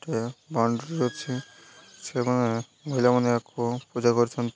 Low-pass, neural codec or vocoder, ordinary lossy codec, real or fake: none; none; none; real